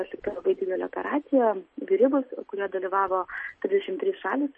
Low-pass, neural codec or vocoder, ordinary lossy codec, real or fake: 10.8 kHz; none; MP3, 32 kbps; real